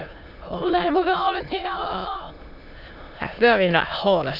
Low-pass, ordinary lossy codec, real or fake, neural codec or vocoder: 5.4 kHz; none; fake; autoencoder, 22.05 kHz, a latent of 192 numbers a frame, VITS, trained on many speakers